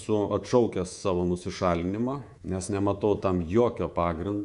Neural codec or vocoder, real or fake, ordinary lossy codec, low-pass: codec, 24 kHz, 3.1 kbps, DualCodec; fake; Opus, 64 kbps; 10.8 kHz